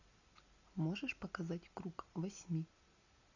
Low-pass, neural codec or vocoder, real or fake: 7.2 kHz; none; real